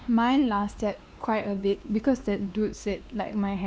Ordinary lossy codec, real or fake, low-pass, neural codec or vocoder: none; fake; none; codec, 16 kHz, 2 kbps, X-Codec, WavLM features, trained on Multilingual LibriSpeech